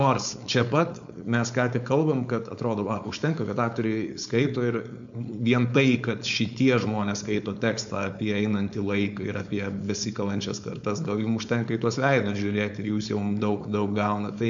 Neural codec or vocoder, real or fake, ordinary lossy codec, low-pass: codec, 16 kHz, 4.8 kbps, FACodec; fake; MP3, 64 kbps; 7.2 kHz